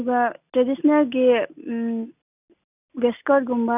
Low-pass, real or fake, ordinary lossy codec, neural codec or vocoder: 3.6 kHz; real; none; none